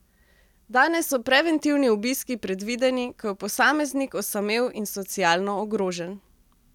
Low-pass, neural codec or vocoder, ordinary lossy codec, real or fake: 19.8 kHz; none; none; real